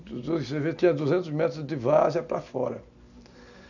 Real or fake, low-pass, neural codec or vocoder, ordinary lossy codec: real; 7.2 kHz; none; none